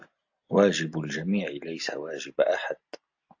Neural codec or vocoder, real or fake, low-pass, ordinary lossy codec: none; real; 7.2 kHz; AAC, 48 kbps